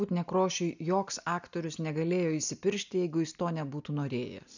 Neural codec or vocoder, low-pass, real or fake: none; 7.2 kHz; real